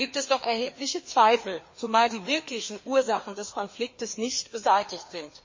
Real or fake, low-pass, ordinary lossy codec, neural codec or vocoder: fake; 7.2 kHz; MP3, 32 kbps; codec, 16 kHz, 2 kbps, FreqCodec, larger model